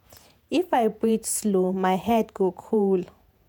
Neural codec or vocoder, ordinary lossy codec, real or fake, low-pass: vocoder, 48 kHz, 128 mel bands, Vocos; none; fake; none